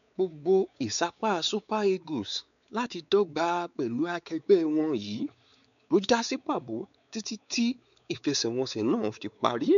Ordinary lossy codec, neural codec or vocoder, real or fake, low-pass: none; codec, 16 kHz, 4 kbps, X-Codec, WavLM features, trained on Multilingual LibriSpeech; fake; 7.2 kHz